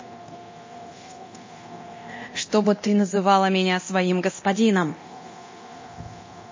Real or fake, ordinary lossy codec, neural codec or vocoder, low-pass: fake; MP3, 32 kbps; codec, 24 kHz, 0.9 kbps, DualCodec; 7.2 kHz